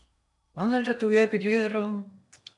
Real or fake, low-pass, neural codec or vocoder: fake; 10.8 kHz; codec, 16 kHz in and 24 kHz out, 0.8 kbps, FocalCodec, streaming, 65536 codes